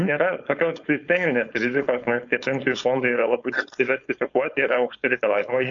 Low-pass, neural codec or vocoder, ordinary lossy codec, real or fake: 7.2 kHz; codec, 16 kHz, 4.8 kbps, FACodec; AAC, 64 kbps; fake